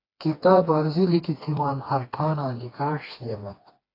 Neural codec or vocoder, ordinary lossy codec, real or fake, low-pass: codec, 16 kHz, 2 kbps, FreqCodec, smaller model; AAC, 24 kbps; fake; 5.4 kHz